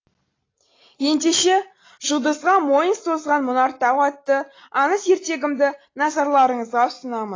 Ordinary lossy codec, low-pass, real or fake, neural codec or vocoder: AAC, 32 kbps; 7.2 kHz; real; none